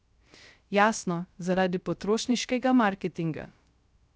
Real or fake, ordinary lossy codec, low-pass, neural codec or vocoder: fake; none; none; codec, 16 kHz, 0.3 kbps, FocalCodec